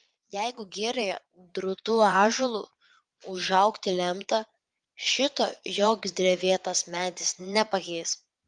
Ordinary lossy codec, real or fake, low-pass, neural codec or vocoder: Opus, 32 kbps; fake; 9.9 kHz; vocoder, 22.05 kHz, 80 mel bands, Vocos